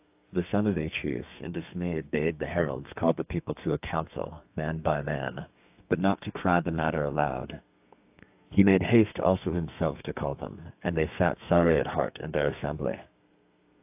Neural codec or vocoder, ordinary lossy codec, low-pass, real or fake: codec, 32 kHz, 1.9 kbps, SNAC; AAC, 32 kbps; 3.6 kHz; fake